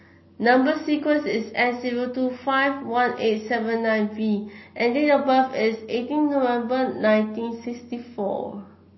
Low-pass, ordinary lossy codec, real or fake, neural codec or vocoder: 7.2 kHz; MP3, 24 kbps; real; none